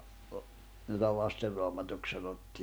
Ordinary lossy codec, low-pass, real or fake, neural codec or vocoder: none; none; real; none